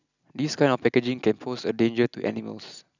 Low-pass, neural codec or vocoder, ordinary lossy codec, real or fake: 7.2 kHz; none; none; real